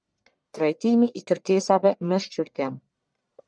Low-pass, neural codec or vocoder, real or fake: 9.9 kHz; codec, 44.1 kHz, 1.7 kbps, Pupu-Codec; fake